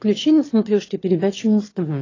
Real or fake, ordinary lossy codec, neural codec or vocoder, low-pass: fake; AAC, 32 kbps; autoencoder, 22.05 kHz, a latent of 192 numbers a frame, VITS, trained on one speaker; 7.2 kHz